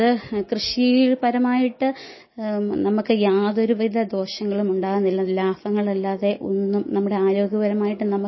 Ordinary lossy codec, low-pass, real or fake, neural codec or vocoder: MP3, 24 kbps; 7.2 kHz; real; none